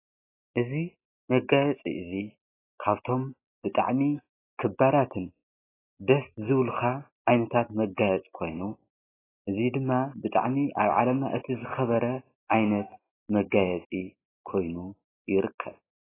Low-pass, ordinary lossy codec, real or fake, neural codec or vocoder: 3.6 kHz; AAC, 16 kbps; real; none